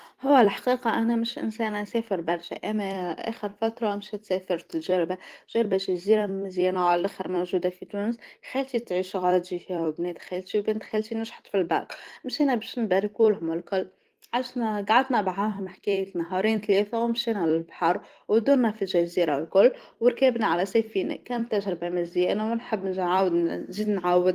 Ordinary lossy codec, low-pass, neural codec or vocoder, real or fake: Opus, 24 kbps; 14.4 kHz; vocoder, 44.1 kHz, 128 mel bands every 256 samples, BigVGAN v2; fake